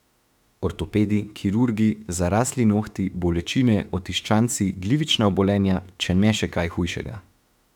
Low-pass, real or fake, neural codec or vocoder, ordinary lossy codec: 19.8 kHz; fake; autoencoder, 48 kHz, 32 numbers a frame, DAC-VAE, trained on Japanese speech; none